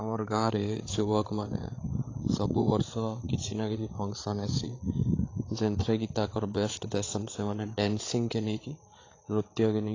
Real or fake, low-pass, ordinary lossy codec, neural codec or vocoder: fake; 7.2 kHz; AAC, 32 kbps; codec, 16 kHz, 8 kbps, FreqCodec, larger model